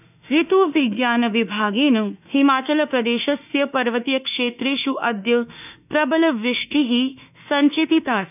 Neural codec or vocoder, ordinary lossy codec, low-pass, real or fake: autoencoder, 48 kHz, 32 numbers a frame, DAC-VAE, trained on Japanese speech; none; 3.6 kHz; fake